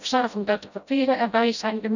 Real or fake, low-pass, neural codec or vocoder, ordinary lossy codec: fake; 7.2 kHz; codec, 16 kHz, 0.5 kbps, FreqCodec, smaller model; none